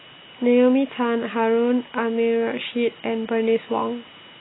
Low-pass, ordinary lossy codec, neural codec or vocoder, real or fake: 7.2 kHz; AAC, 16 kbps; none; real